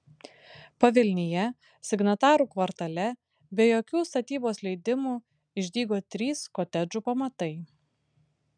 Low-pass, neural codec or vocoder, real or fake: 9.9 kHz; none; real